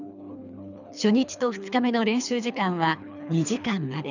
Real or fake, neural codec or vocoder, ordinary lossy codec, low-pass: fake; codec, 24 kHz, 3 kbps, HILCodec; none; 7.2 kHz